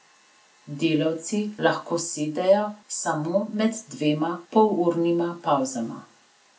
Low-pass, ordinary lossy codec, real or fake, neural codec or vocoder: none; none; real; none